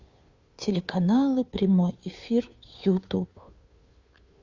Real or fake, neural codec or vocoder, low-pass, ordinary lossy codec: fake; codec, 16 kHz, 2 kbps, FunCodec, trained on Chinese and English, 25 frames a second; 7.2 kHz; Opus, 64 kbps